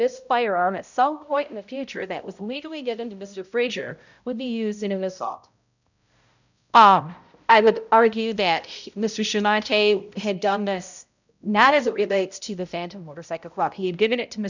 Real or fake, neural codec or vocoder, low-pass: fake; codec, 16 kHz, 0.5 kbps, X-Codec, HuBERT features, trained on balanced general audio; 7.2 kHz